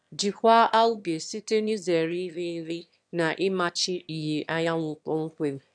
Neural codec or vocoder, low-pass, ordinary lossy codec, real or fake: autoencoder, 22.05 kHz, a latent of 192 numbers a frame, VITS, trained on one speaker; 9.9 kHz; none; fake